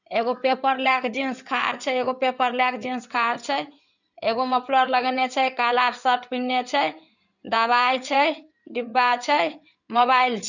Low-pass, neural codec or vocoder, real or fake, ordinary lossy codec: 7.2 kHz; codec, 16 kHz in and 24 kHz out, 2.2 kbps, FireRedTTS-2 codec; fake; none